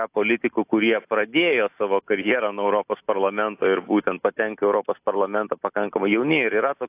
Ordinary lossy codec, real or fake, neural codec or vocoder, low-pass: AAC, 32 kbps; real; none; 3.6 kHz